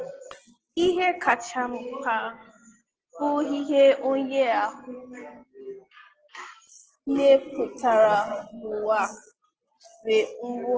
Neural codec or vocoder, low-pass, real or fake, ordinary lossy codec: none; 7.2 kHz; real; Opus, 16 kbps